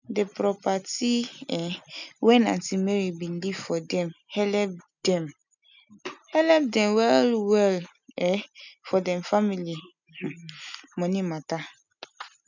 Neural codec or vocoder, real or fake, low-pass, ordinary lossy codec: none; real; 7.2 kHz; none